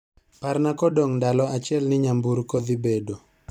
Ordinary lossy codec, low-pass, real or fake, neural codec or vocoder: none; 19.8 kHz; fake; vocoder, 44.1 kHz, 128 mel bands every 256 samples, BigVGAN v2